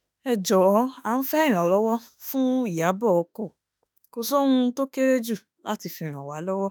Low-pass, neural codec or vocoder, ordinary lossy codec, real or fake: none; autoencoder, 48 kHz, 32 numbers a frame, DAC-VAE, trained on Japanese speech; none; fake